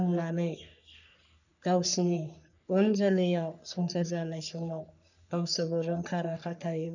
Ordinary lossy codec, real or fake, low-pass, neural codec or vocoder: none; fake; 7.2 kHz; codec, 44.1 kHz, 3.4 kbps, Pupu-Codec